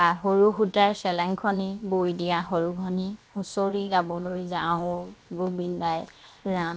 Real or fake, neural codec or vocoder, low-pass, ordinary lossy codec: fake; codec, 16 kHz, 0.7 kbps, FocalCodec; none; none